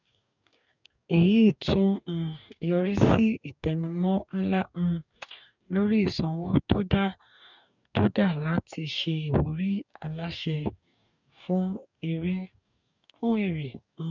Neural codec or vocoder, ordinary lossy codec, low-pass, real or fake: codec, 44.1 kHz, 2.6 kbps, DAC; none; 7.2 kHz; fake